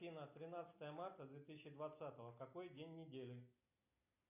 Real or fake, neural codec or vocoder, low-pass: real; none; 3.6 kHz